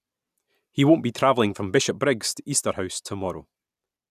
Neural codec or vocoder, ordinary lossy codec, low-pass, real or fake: none; none; 14.4 kHz; real